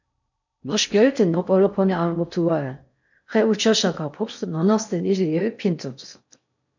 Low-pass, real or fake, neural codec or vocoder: 7.2 kHz; fake; codec, 16 kHz in and 24 kHz out, 0.6 kbps, FocalCodec, streaming, 4096 codes